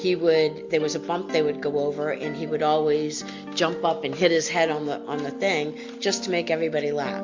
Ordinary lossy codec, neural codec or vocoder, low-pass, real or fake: MP3, 48 kbps; none; 7.2 kHz; real